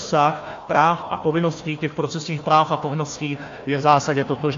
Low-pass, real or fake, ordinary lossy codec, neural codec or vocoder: 7.2 kHz; fake; AAC, 48 kbps; codec, 16 kHz, 1 kbps, FunCodec, trained on Chinese and English, 50 frames a second